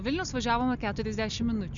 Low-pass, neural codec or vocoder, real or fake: 7.2 kHz; none; real